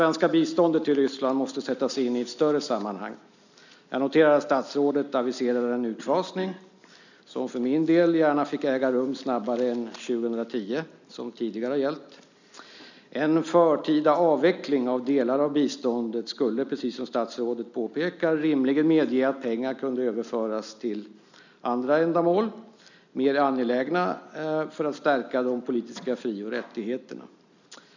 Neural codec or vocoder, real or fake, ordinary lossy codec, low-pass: none; real; none; 7.2 kHz